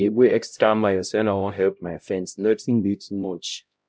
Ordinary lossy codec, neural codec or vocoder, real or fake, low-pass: none; codec, 16 kHz, 0.5 kbps, X-Codec, HuBERT features, trained on LibriSpeech; fake; none